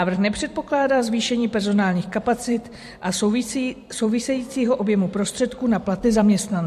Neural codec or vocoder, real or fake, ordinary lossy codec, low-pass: vocoder, 44.1 kHz, 128 mel bands every 256 samples, BigVGAN v2; fake; MP3, 64 kbps; 14.4 kHz